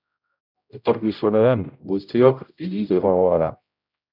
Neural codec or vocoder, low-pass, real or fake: codec, 16 kHz, 0.5 kbps, X-Codec, HuBERT features, trained on general audio; 5.4 kHz; fake